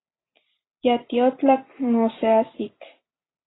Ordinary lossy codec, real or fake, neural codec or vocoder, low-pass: AAC, 16 kbps; real; none; 7.2 kHz